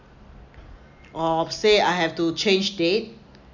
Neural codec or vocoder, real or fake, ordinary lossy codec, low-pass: none; real; none; 7.2 kHz